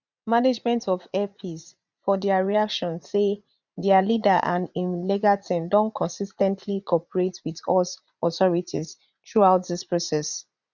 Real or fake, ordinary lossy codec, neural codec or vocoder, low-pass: fake; none; vocoder, 44.1 kHz, 80 mel bands, Vocos; 7.2 kHz